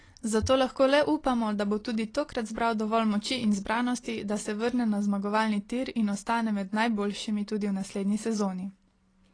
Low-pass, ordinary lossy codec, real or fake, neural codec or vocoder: 9.9 kHz; AAC, 32 kbps; real; none